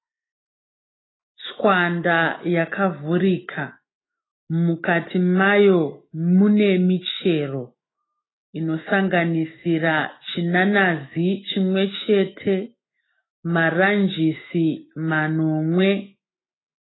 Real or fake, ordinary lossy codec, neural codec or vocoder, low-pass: fake; AAC, 16 kbps; autoencoder, 48 kHz, 128 numbers a frame, DAC-VAE, trained on Japanese speech; 7.2 kHz